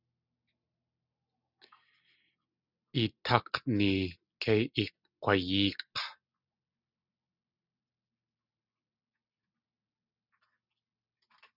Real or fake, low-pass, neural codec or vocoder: real; 5.4 kHz; none